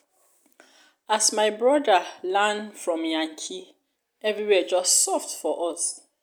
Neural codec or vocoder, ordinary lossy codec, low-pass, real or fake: none; none; none; real